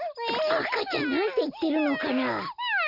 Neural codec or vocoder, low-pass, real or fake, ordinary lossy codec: none; 5.4 kHz; real; none